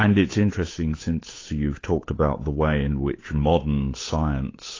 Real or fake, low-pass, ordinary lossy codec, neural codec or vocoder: fake; 7.2 kHz; AAC, 32 kbps; codec, 16 kHz, 8 kbps, FunCodec, trained on Chinese and English, 25 frames a second